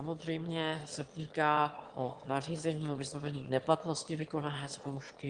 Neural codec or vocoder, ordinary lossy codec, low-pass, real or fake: autoencoder, 22.05 kHz, a latent of 192 numbers a frame, VITS, trained on one speaker; Opus, 24 kbps; 9.9 kHz; fake